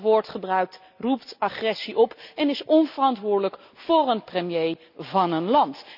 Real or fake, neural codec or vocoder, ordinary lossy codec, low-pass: real; none; none; 5.4 kHz